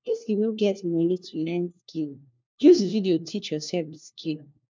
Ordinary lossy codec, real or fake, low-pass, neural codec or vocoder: MP3, 64 kbps; fake; 7.2 kHz; codec, 16 kHz, 1 kbps, FunCodec, trained on LibriTTS, 50 frames a second